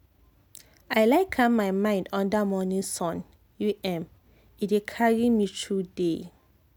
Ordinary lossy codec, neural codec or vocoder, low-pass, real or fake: none; none; none; real